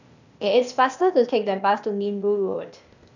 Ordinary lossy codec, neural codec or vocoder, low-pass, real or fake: none; codec, 16 kHz, 0.8 kbps, ZipCodec; 7.2 kHz; fake